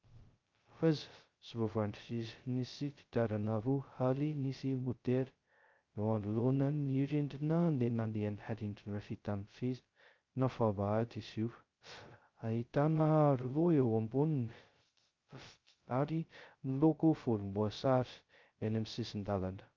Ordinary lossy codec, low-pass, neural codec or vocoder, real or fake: Opus, 32 kbps; 7.2 kHz; codec, 16 kHz, 0.2 kbps, FocalCodec; fake